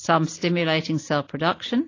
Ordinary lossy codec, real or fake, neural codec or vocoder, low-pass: AAC, 32 kbps; real; none; 7.2 kHz